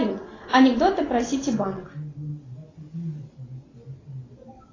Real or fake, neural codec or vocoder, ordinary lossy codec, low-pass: real; none; AAC, 32 kbps; 7.2 kHz